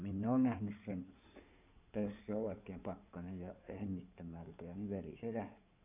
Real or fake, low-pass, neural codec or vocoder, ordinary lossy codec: fake; 3.6 kHz; codec, 16 kHz in and 24 kHz out, 2.2 kbps, FireRedTTS-2 codec; none